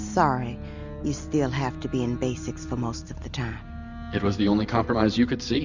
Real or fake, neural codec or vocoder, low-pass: real; none; 7.2 kHz